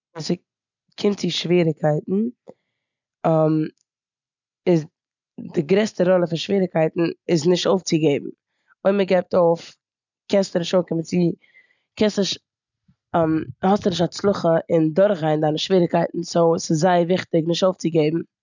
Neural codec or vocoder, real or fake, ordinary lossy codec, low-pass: none; real; none; 7.2 kHz